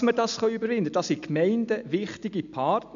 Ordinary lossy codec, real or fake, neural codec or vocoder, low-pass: none; real; none; 7.2 kHz